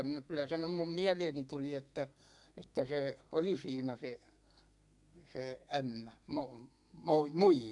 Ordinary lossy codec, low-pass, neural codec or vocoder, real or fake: none; 10.8 kHz; codec, 32 kHz, 1.9 kbps, SNAC; fake